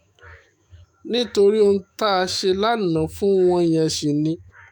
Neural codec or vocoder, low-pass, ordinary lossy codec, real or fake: autoencoder, 48 kHz, 128 numbers a frame, DAC-VAE, trained on Japanese speech; none; none; fake